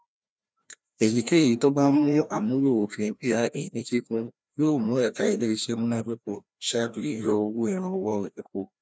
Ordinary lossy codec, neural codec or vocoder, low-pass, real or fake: none; codec, 16 kHz, 1 kbps, FreqCodec, larger model; none; fake